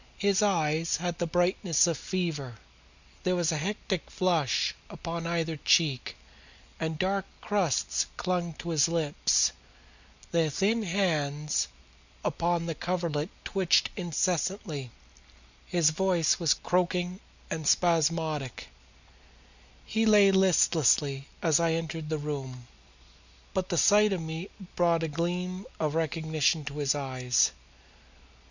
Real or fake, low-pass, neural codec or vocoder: real; 7.2 kHz; none